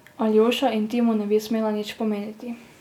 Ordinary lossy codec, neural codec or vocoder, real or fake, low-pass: none; none; real; 19.8 kHz